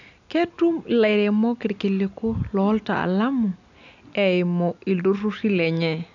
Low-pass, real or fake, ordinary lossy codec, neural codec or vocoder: 7.2 kHz; real; none; none